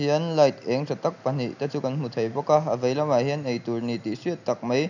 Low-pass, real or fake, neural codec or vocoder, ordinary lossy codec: 7.2 kHz; real; none; none